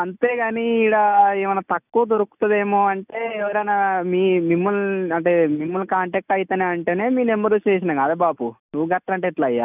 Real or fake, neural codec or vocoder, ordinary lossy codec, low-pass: real; none; none; 3.6 kHz